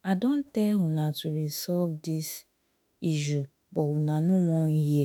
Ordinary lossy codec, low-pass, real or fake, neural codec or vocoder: none; none; fake; autoencoder, 48 kHz, 32 numbers a frame, DAC-VAE, trained on Japanese speech